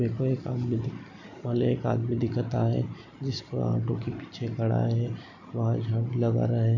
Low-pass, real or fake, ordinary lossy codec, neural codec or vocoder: 7.2 kHz; real; none; none